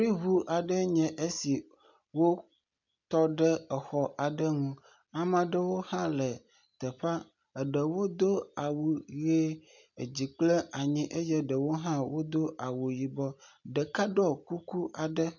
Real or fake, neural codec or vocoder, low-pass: real; none; 7.2 kHz